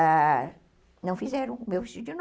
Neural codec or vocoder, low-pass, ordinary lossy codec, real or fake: none; none; none; real